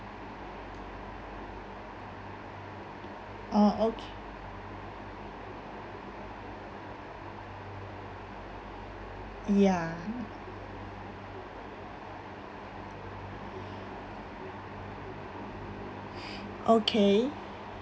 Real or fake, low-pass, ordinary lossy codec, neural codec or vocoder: real; none; none; none